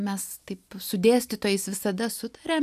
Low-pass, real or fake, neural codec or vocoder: 14.4 kHz; real; none